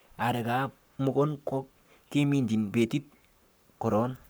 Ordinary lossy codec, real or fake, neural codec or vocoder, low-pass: none; fake; codec, 44.1 kHz, 7.8 kbps, Pupu-Codec; none